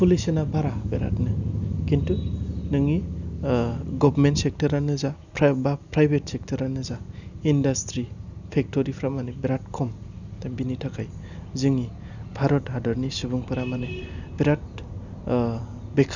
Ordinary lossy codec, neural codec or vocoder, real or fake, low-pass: none; none; real; 7.2 kHz